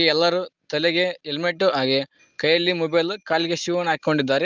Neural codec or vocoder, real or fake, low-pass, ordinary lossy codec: none; real; 7.2 kHz; Opus, 24 kbps